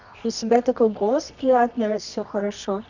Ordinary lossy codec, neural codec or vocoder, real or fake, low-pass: none; codec, 24 kHz, 0.9 kbps, WavTokenizer, medium music audio release; fake; 7.2 kHz